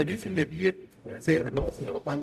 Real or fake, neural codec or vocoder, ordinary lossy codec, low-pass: fake; codec, 44.1 kHz, 0.9 kbps, DAC; none; 14.4 kHz